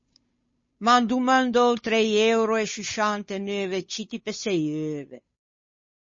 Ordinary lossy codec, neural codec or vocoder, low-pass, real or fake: MP3, 32 kbps; codec, 16 kHz, 8 kbps, FunCodec, trained on Chinese and English, 25 frames a second; 7.2 kHz; fake